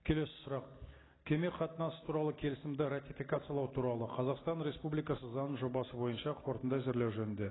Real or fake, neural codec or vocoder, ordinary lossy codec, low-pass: real; none; AAC, 16 kbps; 7.2 kHz